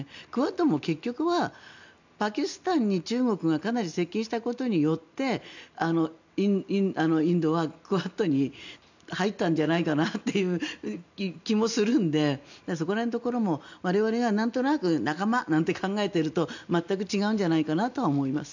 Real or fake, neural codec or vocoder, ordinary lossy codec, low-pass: real; none; none; 7.2 kHz